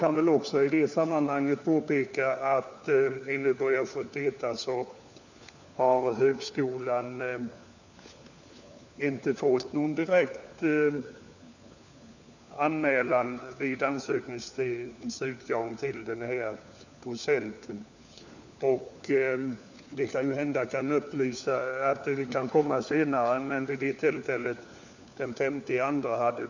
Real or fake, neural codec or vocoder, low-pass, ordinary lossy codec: fake; codec, 16 kHz, 4 kbps, FunCodec, trained on LibriTTS, 50 frames a second; 7.2 kHz; none